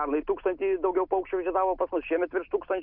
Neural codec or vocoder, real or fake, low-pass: none; real; 5.4 kHz